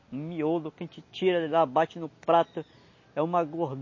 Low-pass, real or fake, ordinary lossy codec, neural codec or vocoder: 7.2 kHz; real; MP3, 32 kbps; none